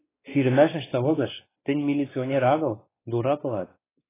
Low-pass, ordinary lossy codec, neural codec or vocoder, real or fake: 3.6 kHz; AAC, 16 kbps; codec, 16 kHz, 2 kbps, X-Codec, WavLM features, trained on Multilingual LibriSpeech; fake